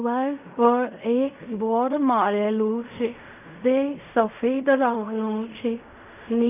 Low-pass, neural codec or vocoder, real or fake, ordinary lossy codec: 3.6 kHz; codec, 16 kHz in and 24 kHz out, 0.4 kbps, LongCat-Audio-Codec, fine tuned four codebook decoder; fake; none